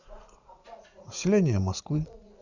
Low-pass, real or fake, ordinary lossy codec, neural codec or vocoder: 7.2 kHz; fake; none; vocoder, 44.1 kHz, 80 mel bands, Vocos